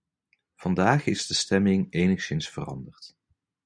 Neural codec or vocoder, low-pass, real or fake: none; 9.9 kHz; real